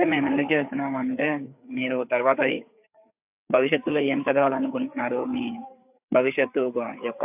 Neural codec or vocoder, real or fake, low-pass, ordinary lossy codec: codec, 16 kHz, 4 kbps, FreqCodec, larger model; fake; 3.6 kHz; AAC, 32 kbps